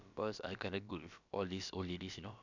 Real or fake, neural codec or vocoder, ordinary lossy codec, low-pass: fake; codec, 16 kHz, about 1 kbps, DyCAST, with the encoder's durations; none; 7.2 kHz